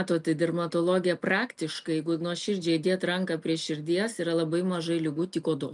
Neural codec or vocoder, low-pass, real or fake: none; 10.8 kHz; real